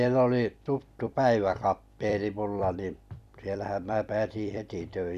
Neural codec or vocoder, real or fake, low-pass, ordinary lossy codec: vocoder, 44.1 kHz, 128 mel bands every 256 samples, BigVGAN v2; fake; 14.4 kHz; none